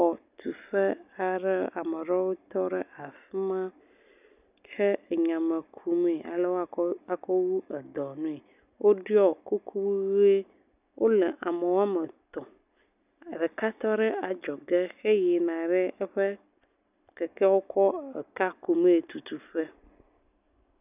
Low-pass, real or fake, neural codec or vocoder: 3.6 kHz; real; none